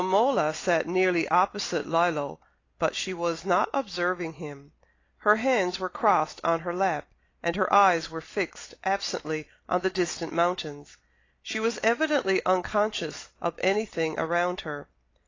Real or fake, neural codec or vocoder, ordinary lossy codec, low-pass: real; none; AAC, 32 kbps; 7.2 kHz